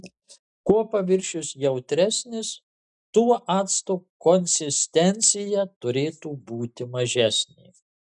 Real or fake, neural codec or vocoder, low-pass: real; none; 10.8 kHz